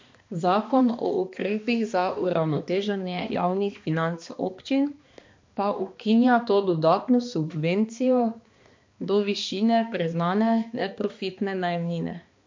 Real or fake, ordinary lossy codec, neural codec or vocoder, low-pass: fake; MP3, 48 kbps; codec, 16 kHz, 2 kbps, X-Codec, HuBERT features, trained on balanced general audio; 7.2 kHz